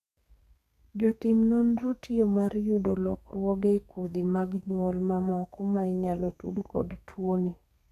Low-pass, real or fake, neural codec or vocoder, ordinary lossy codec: 14.4 kHz; fake; codec, 32 kHz, 1.9 kbps, SNAC; none